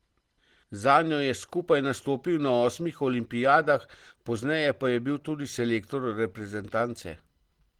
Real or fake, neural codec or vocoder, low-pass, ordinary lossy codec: real; none; 19.8 kHz; Opus, 16 kbps